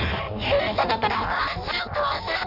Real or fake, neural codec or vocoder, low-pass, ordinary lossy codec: fake; codec, 16 kHz in and 24 kHz out, 0.6 kbps, FireRedTTS-2 codec; 5.4 kHz; none